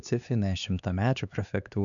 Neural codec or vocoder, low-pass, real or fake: codec, 16 kHz, 2 kbps, X-Codec, HuBERT features, trained on LibriSpeech; 7.2 kHz; fake